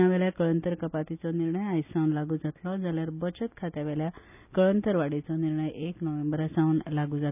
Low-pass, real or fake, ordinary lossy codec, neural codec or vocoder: 3.6 kHz; real; none; none